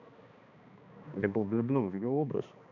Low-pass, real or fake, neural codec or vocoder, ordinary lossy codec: 7.2 kHz; fake; codec, 16 kHz, 2 kbps, X-Codec, HuBERT features, trained on balanced general audio; none